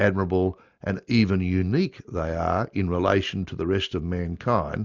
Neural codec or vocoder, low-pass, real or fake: none; 7.2 kHz; real